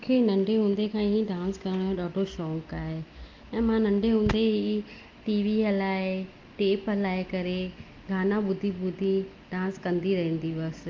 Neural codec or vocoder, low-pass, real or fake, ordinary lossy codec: none; 7.2 kHz; real; Opus, 32 kbps